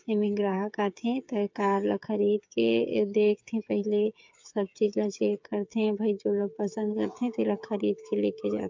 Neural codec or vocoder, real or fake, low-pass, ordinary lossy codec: codec, 16 kHz, 8 kbps, FreqCodec, smaller model; fake; 7.2 kHz; MP3, 64 kbps